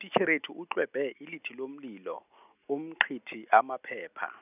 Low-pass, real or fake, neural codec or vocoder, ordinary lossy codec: 3.6 kHz; real; none; none